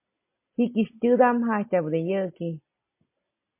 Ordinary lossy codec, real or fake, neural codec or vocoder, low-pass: MP3, 24 kbps; real; none; 3.6 kHz